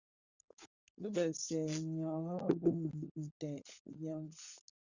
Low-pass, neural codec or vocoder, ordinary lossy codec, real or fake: 7.2 kHz; codec, 16 kHz, 16 kbps, FunCodec, trained on LibriTTS, 50 frames a second; Opus, 64 kbps; fake